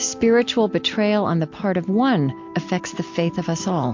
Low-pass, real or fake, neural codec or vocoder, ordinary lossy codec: 7.2 kHz; real; none; MP3, 48 kbps